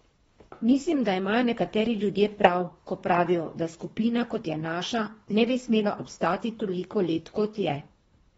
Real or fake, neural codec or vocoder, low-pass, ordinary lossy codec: fake; codec, 24 kHz, 3 kbps, HILCodec; 10.8 kHz; AAC, 24 kbps